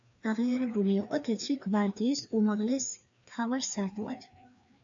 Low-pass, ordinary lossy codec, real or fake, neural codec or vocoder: 7.2 kHz; AAC, 64 kbps; fake; codec, 16 kHz, 2 kbps, FreqCodec, larger model